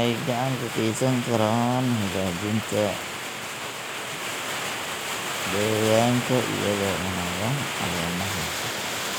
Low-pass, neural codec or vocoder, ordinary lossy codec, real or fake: none; none; none; real